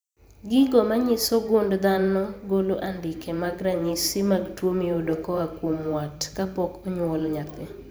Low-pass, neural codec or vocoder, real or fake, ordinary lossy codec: none; vocoder, 44.1 kHz, 128 mel bands every 512 samples, BigVGAN v2; fake; none